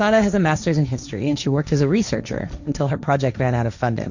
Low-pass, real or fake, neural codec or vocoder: 7.2 kHz; fake; codec, 16 kHz, 1.1 kbps, Voila-Tokenizer